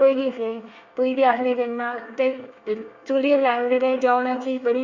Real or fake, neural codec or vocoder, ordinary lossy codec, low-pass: fake; codec, 24 kHz, 1 kbps, SNAC; Opus, 64 kbps; 7.2 kHz